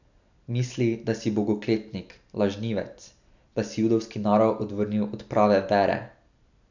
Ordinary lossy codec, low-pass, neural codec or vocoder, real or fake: none; 7.2 kHz; none; real